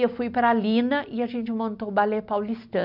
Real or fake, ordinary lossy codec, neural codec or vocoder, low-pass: real; none; none; 5.4 kHz